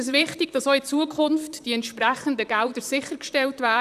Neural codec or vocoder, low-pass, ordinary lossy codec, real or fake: vocoder, 44.1 kHz, 128 mel bands, Pupu-Vocoder; 14.4 kHz; none; fake